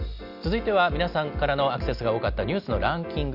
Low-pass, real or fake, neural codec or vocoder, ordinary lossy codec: 5.4 kHz; real; none; none